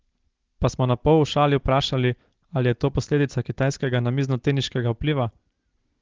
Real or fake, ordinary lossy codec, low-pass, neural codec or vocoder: real; Opus, 16 kbps; 7.2 kHz; none